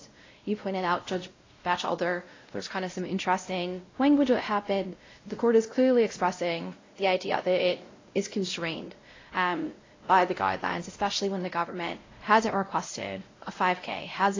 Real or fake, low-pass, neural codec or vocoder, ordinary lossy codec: fake; 7.2 kHz; codec, 16 kHz, 0.5 kbps, X-Codec, WavLM features, trained on Multilingual LibriSpeech; AAC, 32 kbps